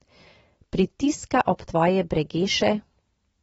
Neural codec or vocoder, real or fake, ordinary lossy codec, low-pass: none; real; AAC, 24 kbps; 19.8 kHz